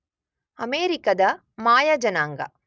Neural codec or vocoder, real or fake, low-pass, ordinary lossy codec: none; real; none; none